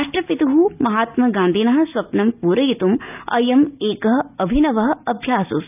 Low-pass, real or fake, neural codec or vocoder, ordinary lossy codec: 3.6 kHz; real; none; none